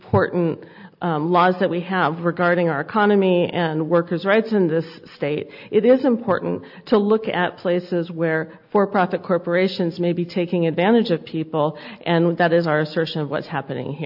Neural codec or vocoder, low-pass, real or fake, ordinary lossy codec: none; 5.4 kHz; real; AAC, 48 kbps